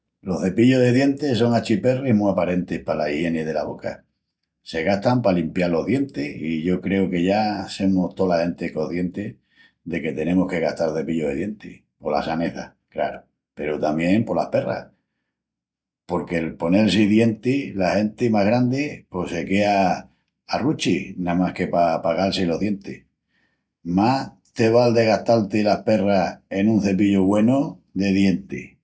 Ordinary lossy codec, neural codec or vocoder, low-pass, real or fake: none; none; none; real